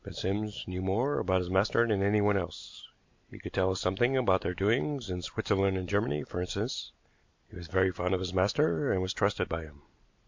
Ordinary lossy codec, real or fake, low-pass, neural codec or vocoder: MP3, 48 kbps; real; 7.2 kHz; none